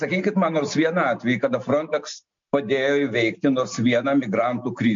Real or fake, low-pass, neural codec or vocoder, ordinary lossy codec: real; 7.2 kHz; none; MP3, 48 kbps